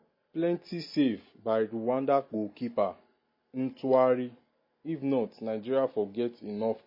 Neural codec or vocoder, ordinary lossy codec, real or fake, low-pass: none; MP3, 24 kbps; real; 5.4 kHz